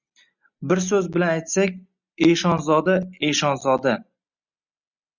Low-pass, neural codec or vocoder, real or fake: 7.2 kHz; none; real